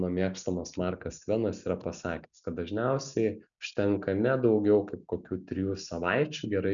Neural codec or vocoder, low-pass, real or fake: none; 7.2 kHz; real